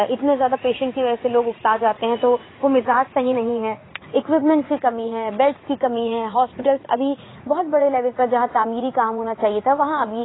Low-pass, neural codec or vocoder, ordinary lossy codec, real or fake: 7.2 kHz; vocoder, 44.1 kHz, 80 mel bands, Vocos; AAC, 16 kbps; fake